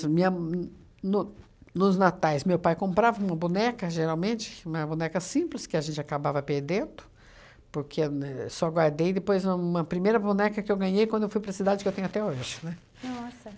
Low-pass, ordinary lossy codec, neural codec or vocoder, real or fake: none; none; none; real